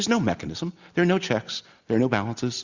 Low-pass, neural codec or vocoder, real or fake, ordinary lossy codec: 7.2 kHz; none; real; Opus, 64 kbps